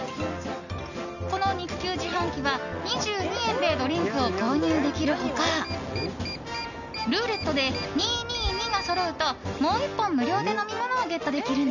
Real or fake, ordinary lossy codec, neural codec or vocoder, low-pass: real; none; none; 7.2 kHz